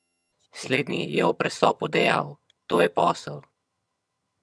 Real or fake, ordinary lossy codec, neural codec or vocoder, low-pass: fake; none; vocoder, 22.05 kHz, 80 mel bands, HiFi-GAN; none